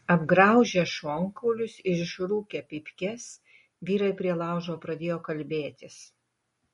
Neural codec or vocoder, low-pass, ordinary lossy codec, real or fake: none; 19.8 kHz; MP3, 48 kbps; real